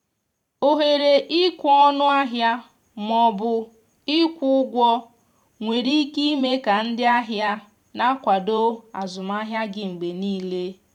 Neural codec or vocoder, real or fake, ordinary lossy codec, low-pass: vocoder, 44.1 kHz, 128 mel bands every 512 samples, BigVGAN v2; fake; none; 19.8 kHz